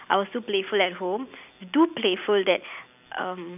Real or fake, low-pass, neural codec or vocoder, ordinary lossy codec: fake; 3.6 kHz; vocoder, 44.1 kHz, 128 mel bands every 256 samples, BigVGAN v2; none